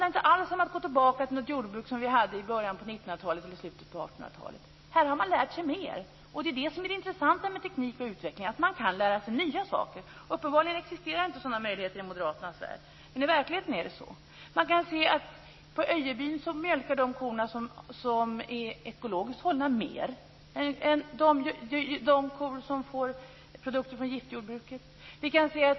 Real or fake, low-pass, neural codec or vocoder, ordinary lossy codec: real; 7.2 kHz; none; MP3, 24 kbps